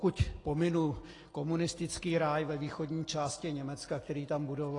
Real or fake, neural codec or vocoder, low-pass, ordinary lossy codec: real; none; 10.8 kHz; AAC, 32 kbps